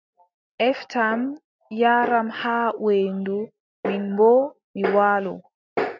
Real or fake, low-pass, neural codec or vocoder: real; 7.2 kHz; none